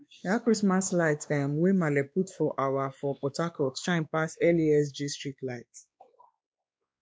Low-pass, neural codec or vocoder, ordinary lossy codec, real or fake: none; codec, 16 kHz, 2 kbps, X-Codec, WavLM features, trained on Multilingual LibriSpeech; none; fake